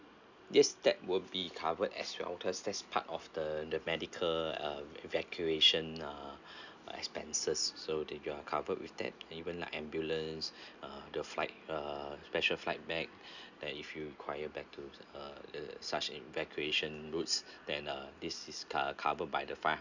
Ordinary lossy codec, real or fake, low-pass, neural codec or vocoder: none; real; 7.2 kHz; none